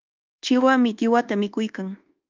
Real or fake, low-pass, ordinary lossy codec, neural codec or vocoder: fake; 7.2 kHz; Opus, 32 kbps; codec, 24 kHz, 1.2 kbps, DualCodec